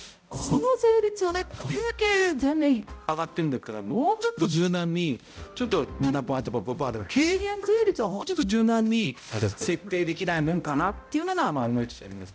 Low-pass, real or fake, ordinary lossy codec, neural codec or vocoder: none; fake; none; codec, 16 kHz, 0.5 kbps, X-Codec, HuBERT features, trained on balanced general audio